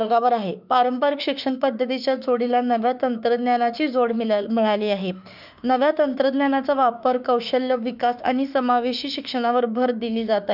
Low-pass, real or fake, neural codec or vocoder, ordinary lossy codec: 5.4 kHz; fake; autoencoder, 48 kHz, 32 numbers a frame, DAC-VAE, trained on Japanese speech; none